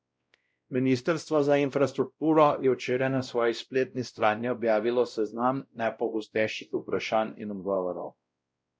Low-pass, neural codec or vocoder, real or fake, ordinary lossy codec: none; codec, 16 kHz, 0.5 kbps, X-Codec, WavLM features, trained on Multilingual LibriSpeech; fake; none